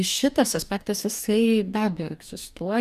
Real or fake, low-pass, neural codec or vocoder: fake; 14.4 kHz; codec, 44.1 kHz, 2.6 kbps, DAC